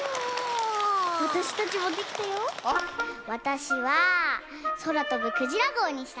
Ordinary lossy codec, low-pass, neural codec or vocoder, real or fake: none; none; none; real